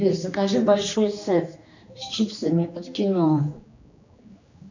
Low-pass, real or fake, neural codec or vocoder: 7.2 kHz; fake; codec, 16 kHz, 2 kbps, X-Codec, HuBERT features, trained on general audio